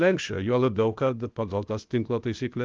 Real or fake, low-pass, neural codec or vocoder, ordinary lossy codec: fake; 7.2 kHz; codec, 16 kHz, 0.8 kbps, ZipCodec; Opus, 32 kbps